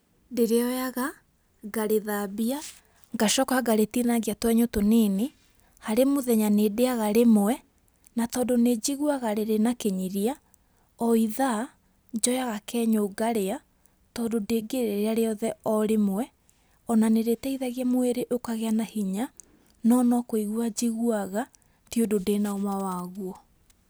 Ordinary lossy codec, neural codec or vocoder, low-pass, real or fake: none; none; none; real